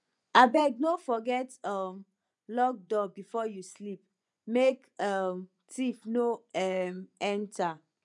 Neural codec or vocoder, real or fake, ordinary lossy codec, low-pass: vocoder, 24 kHz, 100 mel bands, Vocos; fake; none; 10.8 kHz